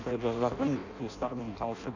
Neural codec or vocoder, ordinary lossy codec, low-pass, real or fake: codec, 16 kHz in and 24 kHz out, 0.6 kbps, FireRedTTS-2 codec; none; 7.2 kHz; fake